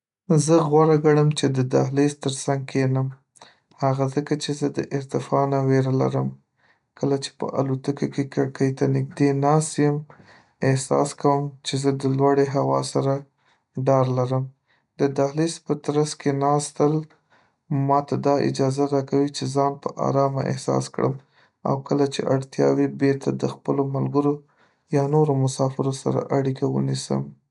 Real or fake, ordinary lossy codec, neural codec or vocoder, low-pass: real; none; none; 10.8 kHz